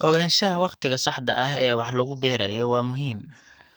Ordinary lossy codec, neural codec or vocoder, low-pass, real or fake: none; codec, 44.1 kHz, 2.6 kbps, SNAC; none; fake